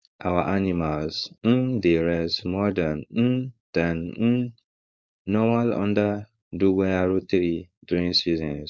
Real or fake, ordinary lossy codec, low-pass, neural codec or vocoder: fake; none; none; codec, 16 kHz, 4.8 kbps, FACodec